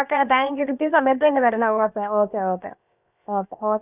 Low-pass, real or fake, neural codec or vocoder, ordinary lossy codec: 3.6 kHz; fake; codec, 16 kHz, about 1 kbps, DyCAST, with the encoder's durations; none